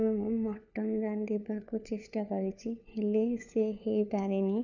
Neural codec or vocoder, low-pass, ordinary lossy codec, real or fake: codec, 16 kHz, 4 kbps, FunCodec, trained on LibriTTS, 50 frames a second; 7.2 kHz; none; fake